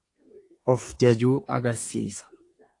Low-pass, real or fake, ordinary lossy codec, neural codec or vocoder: 10.8 kHz; fake; AAC, 48 kbps; codec, 24 kHz, 1 kbps, SNAC